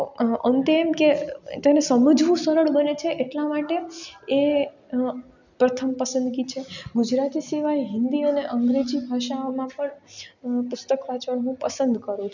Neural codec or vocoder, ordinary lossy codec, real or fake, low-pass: none; none; real; 7.2 kHz